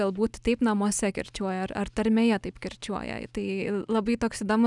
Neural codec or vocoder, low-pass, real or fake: none; 10.8 kHz; real